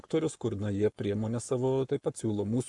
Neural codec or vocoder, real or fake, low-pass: codec, 44.1 kHz, 7.8 kbps, Pupu-Codec; fake; 10.8 kHz